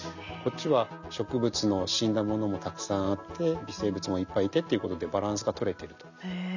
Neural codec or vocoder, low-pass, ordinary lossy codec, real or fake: none; 7.2 kHz; none; real